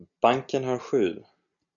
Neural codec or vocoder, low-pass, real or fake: none; 7.2 kHz; real